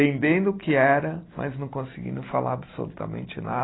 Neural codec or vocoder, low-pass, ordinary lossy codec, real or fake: none; 7.2 kHz; AAC, 16 kbps; real